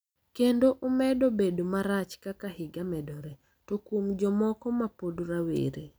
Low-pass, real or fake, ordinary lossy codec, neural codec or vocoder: none; real; none; none